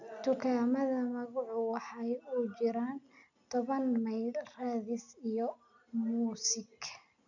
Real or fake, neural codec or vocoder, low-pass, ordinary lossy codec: real; none; 7.2 kHz; none